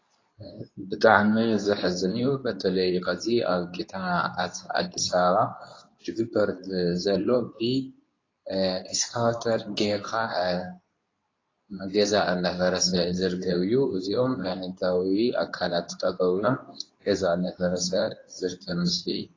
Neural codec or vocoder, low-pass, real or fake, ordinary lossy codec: codec, 24 kHz, 0.9 kbps, WavTokenizer, medium speech release version 2; 7.2 kHz; fake; AAC, 32 kbps